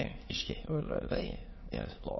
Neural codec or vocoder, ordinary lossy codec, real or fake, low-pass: autoencoder, 22.05 kHz, a latent of 192 numbers a frame, VITS, trained on many speakers; MP3, 24 kbps; fake; 7.2 kHz